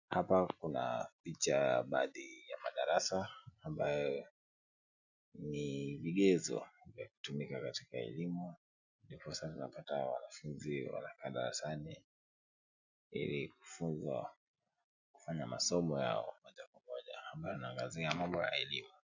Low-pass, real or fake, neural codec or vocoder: 7.2 kHz; real; none